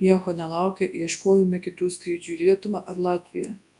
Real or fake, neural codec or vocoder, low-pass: fake; codec, 24 kHz, 0.9 kbps, WavTokenizer, large speech release; 10.8 kHz